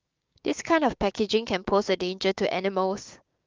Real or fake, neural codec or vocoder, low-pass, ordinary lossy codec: fake; codec, 16 kHz, 6 kbps, DAC; 7.2 kHz; Opus, 24 kbps